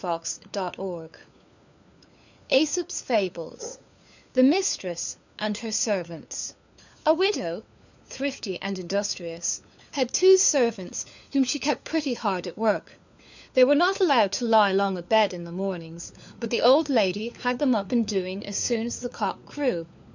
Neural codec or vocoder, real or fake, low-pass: codec, 16 kHz, 4 kbps, FunCodec, trained on LibriTTS, 50 frames a second; fake; 7.2 kHz